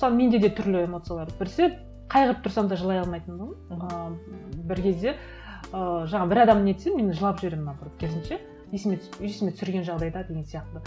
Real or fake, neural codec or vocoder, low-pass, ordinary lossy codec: real; none; none; none